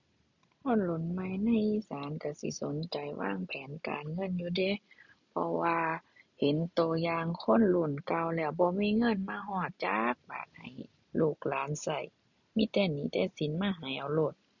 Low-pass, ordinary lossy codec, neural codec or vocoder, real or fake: 7.2 kHz; none; none; real